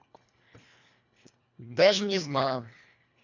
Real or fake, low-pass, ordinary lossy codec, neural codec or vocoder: fake; 7.2 kHz; none; codec, 24 kHz, 1.5 kbps, HILCodec